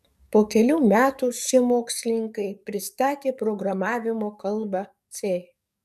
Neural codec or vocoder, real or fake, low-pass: codec, 44.1 kHz, 7.8 kbps, DAC; fake; 14.4 kHz